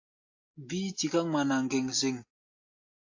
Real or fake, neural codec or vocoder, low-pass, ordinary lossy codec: real; none; 7.2 kHz; AAC, 48 kbps